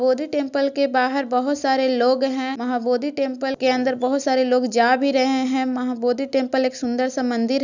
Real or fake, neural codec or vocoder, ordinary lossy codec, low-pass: real; none; none; 7.2 kHz